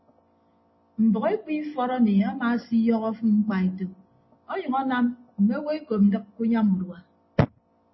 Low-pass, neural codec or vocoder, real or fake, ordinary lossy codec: 7.2 kHz; none; real; MP3, 24 kbps